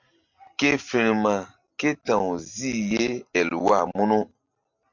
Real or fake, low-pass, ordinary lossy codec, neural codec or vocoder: real; 7.2 kHz; MP3, 64 kbps; none